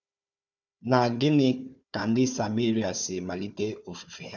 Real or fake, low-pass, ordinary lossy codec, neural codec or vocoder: fake; 7.2 kHz; none; codec, 16 kHz, 4 kbps, FunCodec, trained on Chinese and English, 50 frames a second